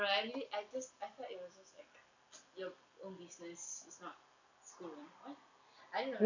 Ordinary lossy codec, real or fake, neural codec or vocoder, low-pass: none; real; none; 7.2 kHz